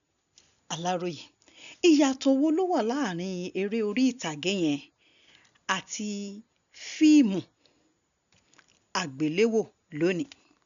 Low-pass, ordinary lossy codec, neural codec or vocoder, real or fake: 7.2 kHz; none; none; real